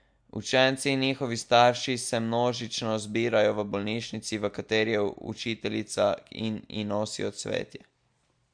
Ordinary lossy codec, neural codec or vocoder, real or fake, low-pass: MP3, 64 kbps; none; real; 9.9 kHz